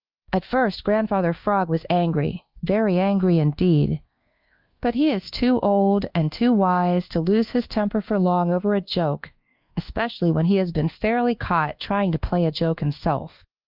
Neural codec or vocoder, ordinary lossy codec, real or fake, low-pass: codec, 24 kHz, 1.2 kbps, DualCodec; Opus, 32 kbps; fake; 5.4 kHz